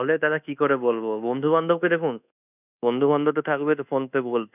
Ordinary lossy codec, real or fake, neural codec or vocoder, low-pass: none; fake; codec, 24 kHz, 1.2 kbps, DualCodec; 3.6 kHz